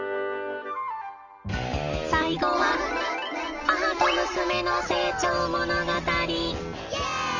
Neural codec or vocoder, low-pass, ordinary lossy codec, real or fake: none; 7.2 kHz; none; real